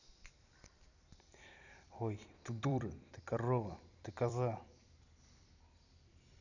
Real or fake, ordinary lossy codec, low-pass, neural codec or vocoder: fake; none; 7.2 kHz; vocoder, 44.1 kHz, 128 mel bands every 256 samples, BigVGAN v2